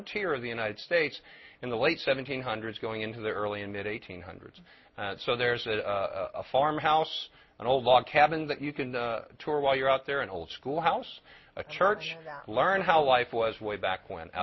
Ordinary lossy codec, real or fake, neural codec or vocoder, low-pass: MP3, 24 kbps; real; none; 7.2 kHz